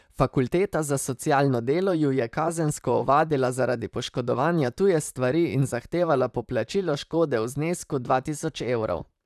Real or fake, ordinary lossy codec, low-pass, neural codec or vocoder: fake; none; 14.4 kHz; vocoder, 44.1 kHz, 128 mel bands, Pupu-Vocoder